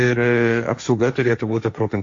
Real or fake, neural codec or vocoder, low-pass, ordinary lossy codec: fake; codec, 16 kHz, 1.1 kbps, Voila-Tokenizer; 7.2 kHz; AAC, 48 kbps